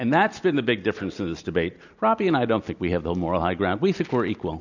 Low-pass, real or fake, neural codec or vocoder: 7.2 kHz; real; none